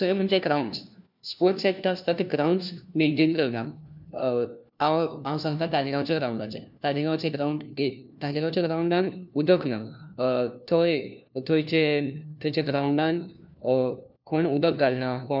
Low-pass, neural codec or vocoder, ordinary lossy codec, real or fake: 5.4 kHz; codec, 16 kHz, 1 kbps, FunCodec, trained on LibriTTS, 50 frames a second; none; fake